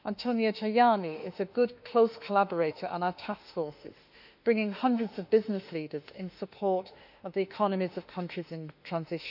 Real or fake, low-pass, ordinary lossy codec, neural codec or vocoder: fake; 5.4 kHz; none; autoencoder, 48 kHz, 32 numbers a frame, DAC-VAE, trained on Japanese speech